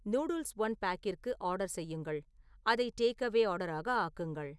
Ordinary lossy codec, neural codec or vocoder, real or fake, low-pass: none; none; real; none